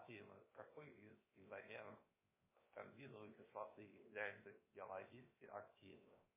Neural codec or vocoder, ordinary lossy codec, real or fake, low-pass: codec, 16 kHz, 0.7 kbps, FocalCodec; MP3, 16 kbps; fake; 3.6 kHz